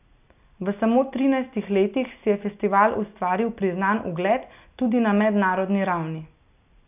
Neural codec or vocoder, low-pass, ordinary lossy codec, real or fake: none; 3.6 kHz; none; real